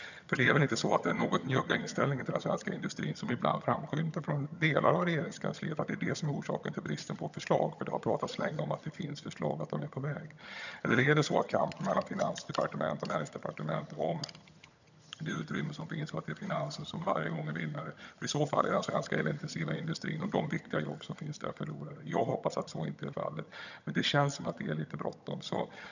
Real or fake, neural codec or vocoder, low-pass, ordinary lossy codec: fake; vocoder, 22.05 kHz, 80 mel bands, HiFi-GAN; 7.2 kHz; none